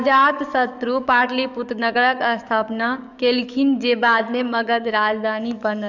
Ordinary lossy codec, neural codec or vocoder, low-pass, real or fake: none; codec, 44.1 kHz, 7.8 kbps, DAC; 7.2 kHz; fake